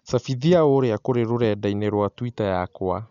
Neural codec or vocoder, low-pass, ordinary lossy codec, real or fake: none; 7.2 kHz; none; real